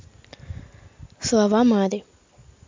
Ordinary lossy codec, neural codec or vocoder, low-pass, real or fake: MP3, 64 kbps; none; 7.2 kHz; real